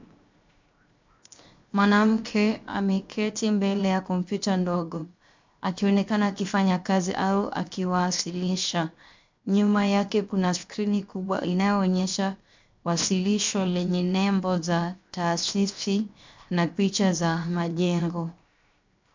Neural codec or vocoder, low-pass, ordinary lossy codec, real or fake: codec, 16 kHz, 0.7 kbps, FocalCodec; 7.2 kHz; MP3, 64 kbps; fake